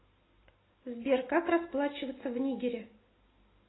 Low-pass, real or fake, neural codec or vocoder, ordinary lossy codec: 7.2 kHz; real; none; AAC, 16 kbps